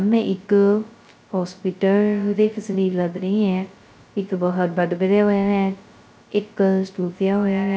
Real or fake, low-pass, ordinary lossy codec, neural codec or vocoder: fake; none; none; codec, 16 kHz, 0.2 kbps, FocalCodec